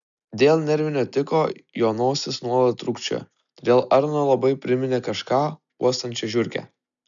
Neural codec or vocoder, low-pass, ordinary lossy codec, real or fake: none; 7.2 kHz; MP3, 96 kbps; real